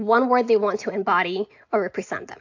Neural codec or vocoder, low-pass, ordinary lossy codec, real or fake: none; 7.2 kHz; AAC, 48 kbps; real